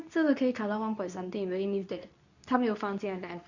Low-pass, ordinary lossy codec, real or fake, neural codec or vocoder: 7.2 kHz; none; fake; codec, 24 kHz, 0.9 kbps, WavTokenizer, medium speech release version 2